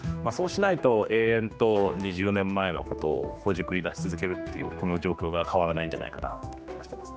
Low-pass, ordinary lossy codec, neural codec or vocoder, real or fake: none; none; codec, 16 kHz, 2 kbps, X-Codec, HuBERT features, trained on general audio; fake